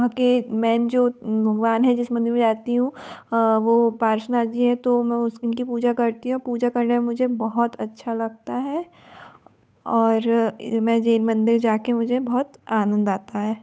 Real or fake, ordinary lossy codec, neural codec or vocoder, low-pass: fake; none; codec, 16 kHz, 8 kbps, FunCodec, trained on Chinese and English, 25 frames a second; none